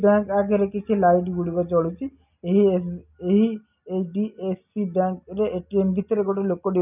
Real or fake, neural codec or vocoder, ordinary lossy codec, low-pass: real; none; none; 3.6 kHz